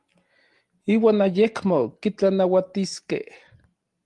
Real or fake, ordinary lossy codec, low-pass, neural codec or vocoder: real; Opus, 24 kbps; 10.8 kHz; none